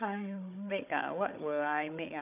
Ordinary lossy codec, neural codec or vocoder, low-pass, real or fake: none; codec, 16 kHz, 16 kbps, FunCodec, trained on Chinese and English, 50 frames a second; 3.6 kHz; fake